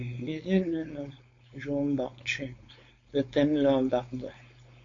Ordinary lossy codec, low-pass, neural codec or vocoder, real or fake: MP3, 48 kbps; 7.2 kHz; codec, 16 kHz, 4.8 kbps, FACodec; fake